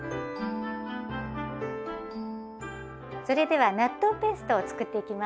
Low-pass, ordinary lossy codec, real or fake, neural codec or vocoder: none; none; real; none